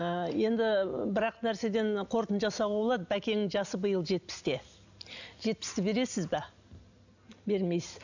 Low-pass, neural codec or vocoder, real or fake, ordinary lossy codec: 7.2 kHz; none; real; none